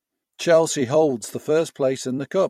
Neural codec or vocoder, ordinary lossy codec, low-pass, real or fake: vocoder, 44.1 kHz, 128 mel bands every 256 samples, BigVGAN v2; MP3, 64 kbps; 19.8 kHz; fake